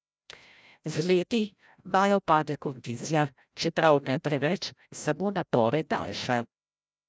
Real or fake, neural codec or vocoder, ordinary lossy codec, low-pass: fake; codec, 16 kHz, 0.5 kbps, FreqCodec, larger model; none; none